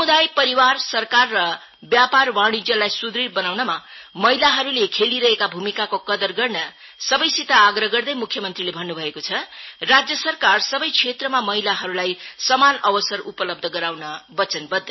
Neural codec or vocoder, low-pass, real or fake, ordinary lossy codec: none; 7.2 kHz; real; MP3, 24 kbps